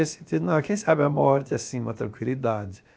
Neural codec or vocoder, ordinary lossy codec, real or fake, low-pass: codec, 16 kHz, about 1 kbps, DyCAST, with the encoder's durations; none; fake; none